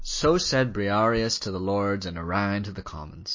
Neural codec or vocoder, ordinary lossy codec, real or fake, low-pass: none; MP3, 32 kbps; real; 7.2 kHz